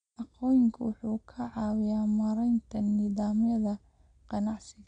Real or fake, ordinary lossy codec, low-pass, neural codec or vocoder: real; none; 9.9 kHz; none